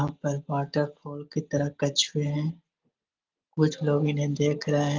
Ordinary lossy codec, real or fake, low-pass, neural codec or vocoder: Opus, 32 kbps; real; 7.2 kHz; none